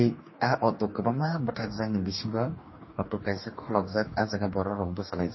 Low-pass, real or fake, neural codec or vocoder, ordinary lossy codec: 7.2 kHz; fake; codec, 44.1 kHz, 2.6 kbps, DAC; MP3, 24 kbps